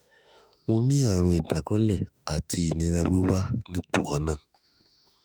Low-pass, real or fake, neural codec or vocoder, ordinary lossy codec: none; fake; autoencoder, 48 kHz, 32 numbers a frame, DAC-VAE, trained on Japanese speech; none